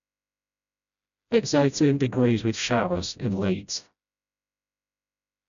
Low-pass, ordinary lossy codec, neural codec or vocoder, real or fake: 7.2 kHz; none; codec, 16 kHz, 0.5 kbps, FreqCodec, smaller model; fake